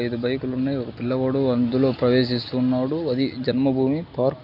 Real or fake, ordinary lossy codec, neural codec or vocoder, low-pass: real; none; none; 5.4 kHz